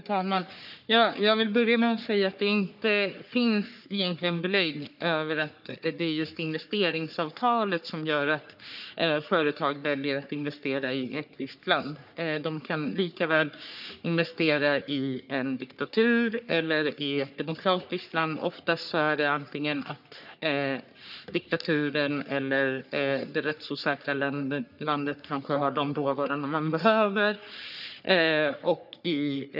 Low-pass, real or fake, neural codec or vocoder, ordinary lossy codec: 5.4 kHz; fake; codec, 44.1 kHz, 3.4 kbps, Pupu-Codec; none